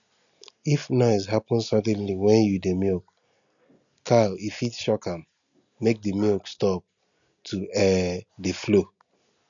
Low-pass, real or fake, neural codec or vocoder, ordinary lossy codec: 7.2 kHz; real; none; none